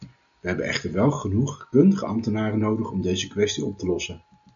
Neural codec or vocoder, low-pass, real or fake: none; 7.2 kHz; real